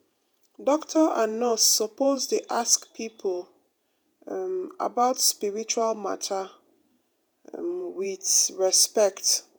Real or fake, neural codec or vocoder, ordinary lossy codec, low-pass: fake; vocoder, 48 kHz, 128 mel bands, Vocos; none; none